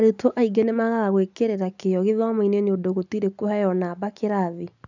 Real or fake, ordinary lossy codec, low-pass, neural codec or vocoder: real; none; 7.2 kHz; none